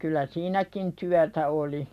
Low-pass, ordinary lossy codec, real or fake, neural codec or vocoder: 14.4 kHz; none; fake; vocoder, 44.1 kHz, 128 mel bands every 256 samples, BigVGAN v2